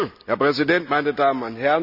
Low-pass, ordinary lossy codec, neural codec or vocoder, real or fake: 5.4 kHz; none; none; real